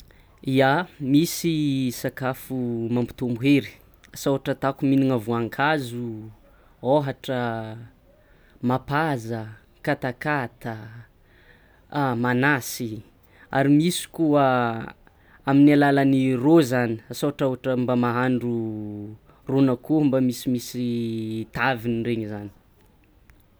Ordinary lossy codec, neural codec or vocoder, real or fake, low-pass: none; none; real; none